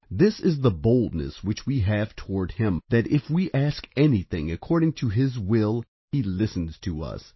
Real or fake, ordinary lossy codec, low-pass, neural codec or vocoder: real; MP3, 24 kbps; 7.2 kHz; none